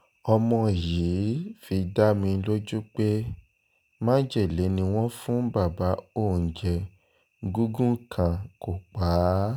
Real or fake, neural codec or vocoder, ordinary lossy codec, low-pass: real; none; none; none